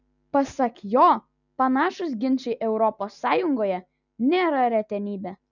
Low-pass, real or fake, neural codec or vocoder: 7.2 kHz; real; none